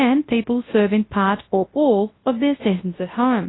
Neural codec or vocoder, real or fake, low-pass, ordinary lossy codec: codec, 24 kHz, 0.9 kbps, WavTokenizer, large speech release; fake; 7.2 kHz; AAC, 16 kbps